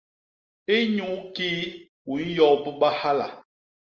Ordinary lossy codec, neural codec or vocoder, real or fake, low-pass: Opus, 24 kbps; none; real; 7.2 kHz